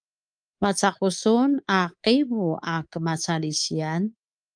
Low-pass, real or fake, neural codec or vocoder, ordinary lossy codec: 9.9 kHz; fake; codec, 24 kHz, 3.1 kbps, DualCodec; Opus, 32 kbps